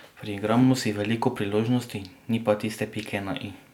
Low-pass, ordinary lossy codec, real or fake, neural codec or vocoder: 19.8 kHz; none; real; none